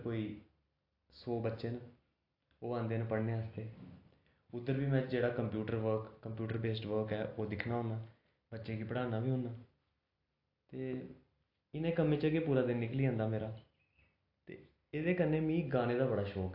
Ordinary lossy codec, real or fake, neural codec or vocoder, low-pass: AAC, 32 kbps; real; none; 5.4 kHz